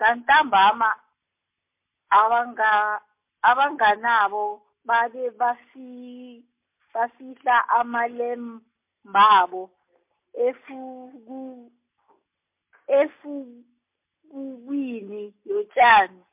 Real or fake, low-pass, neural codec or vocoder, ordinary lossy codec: real; 3.6 kHz; none; MP3, 32 kbps